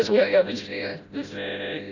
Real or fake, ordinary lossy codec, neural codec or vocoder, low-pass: fake; none; codec, 16 kHz, 0.5 kbps, FreqCodec, smaller model; 7.2 kHz